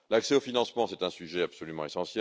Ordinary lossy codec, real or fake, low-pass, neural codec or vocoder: none; real; none; none